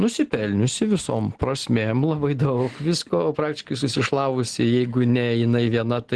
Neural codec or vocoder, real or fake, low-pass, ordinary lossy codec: none; real; 10.8 kHz; Opus, 16 kbps